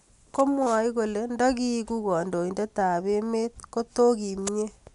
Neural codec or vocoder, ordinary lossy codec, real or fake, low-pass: none; none; real; 10.8 kHz